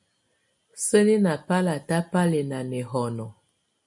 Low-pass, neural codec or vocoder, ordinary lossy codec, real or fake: 10.8 kHz; none; MP3, 48 kbps; real